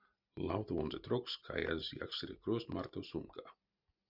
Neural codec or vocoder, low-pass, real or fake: none; 5.4 kHz; real